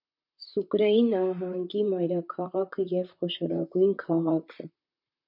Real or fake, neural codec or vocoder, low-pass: fake; vocoder, 44.1 kHz, 128 mel bands, Pupu-Vocoder; 5.4 kHz